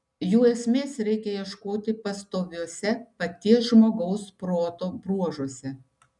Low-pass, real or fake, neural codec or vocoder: 10.8 kHz; real; none